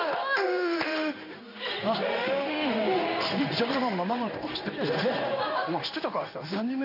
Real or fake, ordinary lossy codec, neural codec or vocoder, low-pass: fake; none; codec, 16 kHz in and 24 kHz out, 1 kbps, XY-Tokenizer; 5.4 kHz